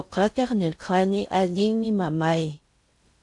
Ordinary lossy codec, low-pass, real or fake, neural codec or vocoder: AAC, 64 kbps; 10.8 kHz; fake; codec, 16 kHz in and 24 kHz out, 0.6 kbps, FocalCodec, streaming, 4096 codes